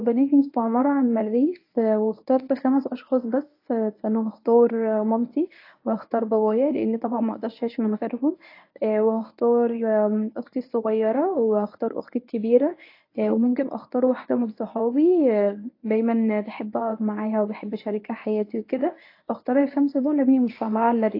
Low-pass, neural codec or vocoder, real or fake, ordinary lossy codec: 5.4 kHz; codec, 24 kHz, 0.9 kbps, WavTokenizer, medium speech release version 1; fake; AAC, 32 kbps